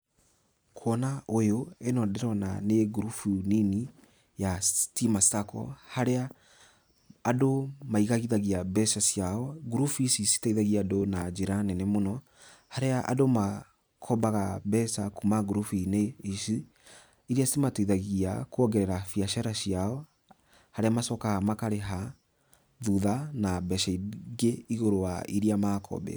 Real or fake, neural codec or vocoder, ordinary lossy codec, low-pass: real; none; none; none